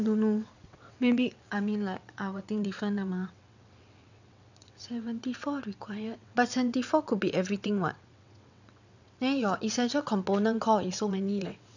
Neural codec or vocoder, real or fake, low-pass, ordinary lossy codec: vocoder, 22.05 kHz, 80 mel bands, WaveNeXt; fake; 7.2 kHz; none